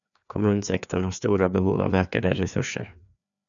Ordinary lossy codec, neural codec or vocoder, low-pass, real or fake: MP3, 96 kbps; codec, 16 kHz, 2 kbps, FreqCodec, larger model; 7.2 kHz; fake